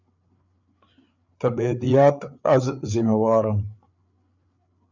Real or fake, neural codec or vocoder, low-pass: fake; codec, 16 kHz, 8 kbps, FreqCodec, larger model; 7.2 kHz